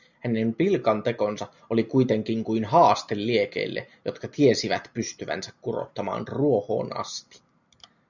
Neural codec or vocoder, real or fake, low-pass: none; real; 7.2 kHz